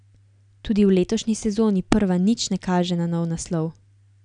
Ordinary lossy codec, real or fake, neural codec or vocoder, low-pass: none; real; none; 9.9 kHz